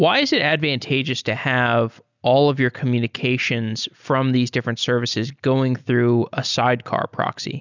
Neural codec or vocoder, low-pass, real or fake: none; 7.2 kHz; real